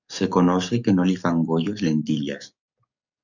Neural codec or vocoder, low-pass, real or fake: codec, 44.1 kHz, 7.8 kbps, DAC; 7.2 kHz; fake